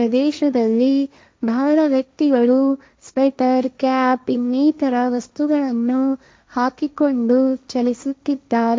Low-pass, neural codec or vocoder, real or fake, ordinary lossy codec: none; codec, 16 kHz, 1.1 kbps, Voila-Tokenizer; fake; none